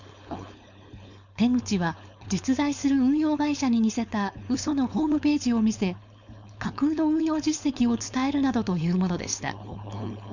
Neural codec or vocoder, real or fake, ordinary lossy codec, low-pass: codec, 16 kHz, 4.8 kbps, FACodec; fake; none; 7.2 kHz